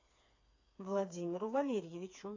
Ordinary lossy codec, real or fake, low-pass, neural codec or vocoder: AAC, 48 kbps; fake; 7.2 kHz; codec, 16 kHz, 4 kbps, FreqCodec, smaller model